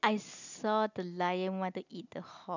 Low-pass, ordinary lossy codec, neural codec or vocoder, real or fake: 7.2 kHz; none; none; real